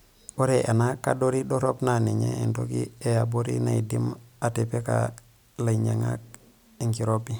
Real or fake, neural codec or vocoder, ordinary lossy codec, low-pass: real; none; none; none